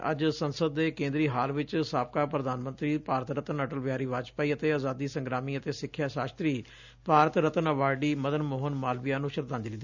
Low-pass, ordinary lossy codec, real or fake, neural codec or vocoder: 7.2 kHz; none; real; none